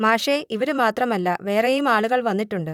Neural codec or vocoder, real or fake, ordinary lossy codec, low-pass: vocoder, 44.1 kHz, 128 mel bands, Pupu-Vocoder; fake; none; 19.8 kHz